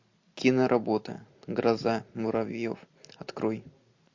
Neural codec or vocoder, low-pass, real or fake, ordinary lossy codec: none; 7.2 kHz; real; MP3, 48 kbps